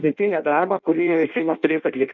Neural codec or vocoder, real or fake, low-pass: codec, 16 kHz in and 24 kHz out, 0.6 kbps, FireRedTTS-2 codec; fake; 7.2 kHz